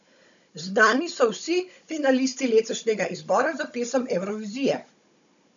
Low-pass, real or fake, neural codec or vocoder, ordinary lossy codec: 7.2 kHz; fake; codec, 16 kHz, 16 kbps, FunCodec, trained on Chinese and English, 50 frames a second; none